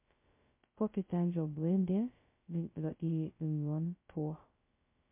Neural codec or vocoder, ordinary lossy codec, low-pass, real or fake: codec, 16 kHz, 0.2 kbps, FocalCodec; MP3, 24 kbps; 3.6 kHz; fake